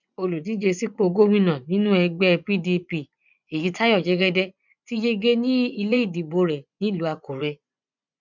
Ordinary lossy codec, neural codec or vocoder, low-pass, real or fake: none; vocoder, 24 kHz, 100 mel bands, Vocos; 7.2 kHz; fake